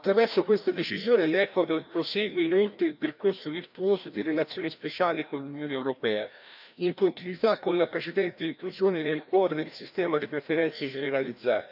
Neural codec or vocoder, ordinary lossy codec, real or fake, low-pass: codec, 16 kHz, 1 kbps, FreqCodec, larger model; none; fake; 5.4 kHz